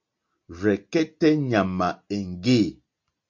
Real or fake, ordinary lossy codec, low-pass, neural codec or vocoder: real; MP3, 48 kbps; 7.2 kHz; none